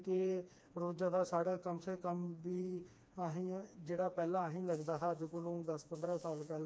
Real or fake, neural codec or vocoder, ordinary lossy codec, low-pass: fake; codec, 16 kHz, 2 kbps, FreqCodec, smaller model; none; none